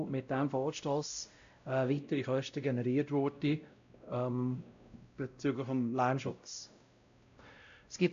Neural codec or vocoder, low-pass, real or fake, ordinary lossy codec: codec, 16 kHz, 0.5 kbps, X-Codec, WavLM features, trained on Multilingual LibriSpeech; 7.2 kHz; fake; AAC, 64 kbps